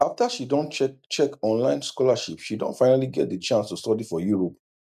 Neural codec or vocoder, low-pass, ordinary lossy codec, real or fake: none; 14.4 kHz; none; real